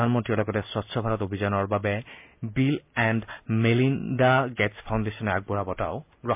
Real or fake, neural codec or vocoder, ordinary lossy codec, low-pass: real; none; MP3, 32 kbps; 3.6 kHz